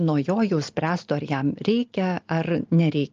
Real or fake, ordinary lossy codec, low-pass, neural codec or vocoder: real; Opus, 24 kbps; 7.2 kHz; none